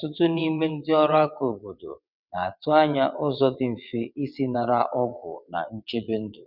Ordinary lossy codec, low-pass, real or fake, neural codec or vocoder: none; 5.4 kHz; fake; vocoder, 22.05 kHz, 80 mel bands, WaveNeXt